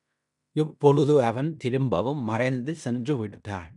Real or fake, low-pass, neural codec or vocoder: fake; 10.8 kHz; codec, 16 kHz in and 24 kHz out, 0.9 kbps, LongCat-Audio-Codec, fine tuned four codebook decoder